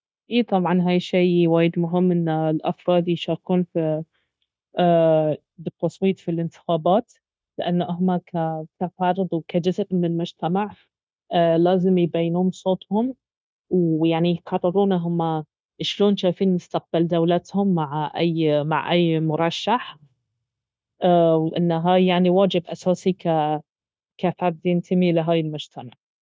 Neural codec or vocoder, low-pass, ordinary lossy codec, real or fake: codec, 16 kHz, 0.9 kbps, LongCat-Audio-Codec; none; none; fake